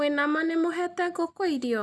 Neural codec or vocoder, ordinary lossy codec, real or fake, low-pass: none; none; real; none